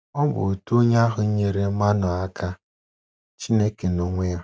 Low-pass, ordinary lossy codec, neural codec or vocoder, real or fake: none; none; none; real